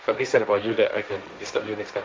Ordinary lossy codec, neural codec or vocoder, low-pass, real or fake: none; codec, 16 kHz, 1.1 kbps, Voila-Tokenizer; none; fake